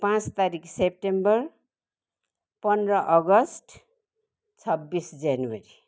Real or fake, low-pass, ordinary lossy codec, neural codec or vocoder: real; none; none; none